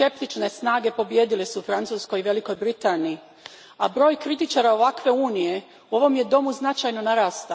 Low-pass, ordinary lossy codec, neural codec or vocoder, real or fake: none; none; none; real